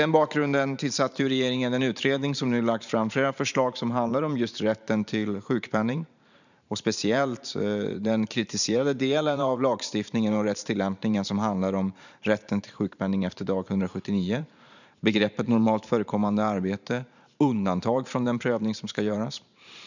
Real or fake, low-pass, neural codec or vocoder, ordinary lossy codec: fake; 7.2 kHz; vocoder, 44.1 kHz, 128 mel bands every 512 samples, BigVGAN v2; none